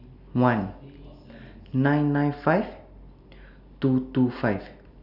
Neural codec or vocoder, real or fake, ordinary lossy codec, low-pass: none; real; none; 5.4 kHz